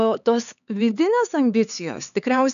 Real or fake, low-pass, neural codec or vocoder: fake; 7.2 kHz; codec, 16 kHz, 4 kbps, X-Codec, HuBERT features, trained on LibriSpeech